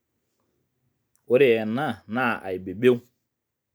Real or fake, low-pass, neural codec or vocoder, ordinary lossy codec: real; none; none; none